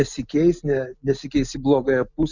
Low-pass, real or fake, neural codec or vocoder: 7.2 kHz; real; none